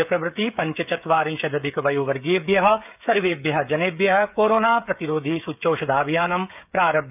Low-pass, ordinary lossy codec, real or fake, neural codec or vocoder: 3.6 kHz; none; fake; codec, 16 kHz, 16 kbps, FreqCodec, smaller model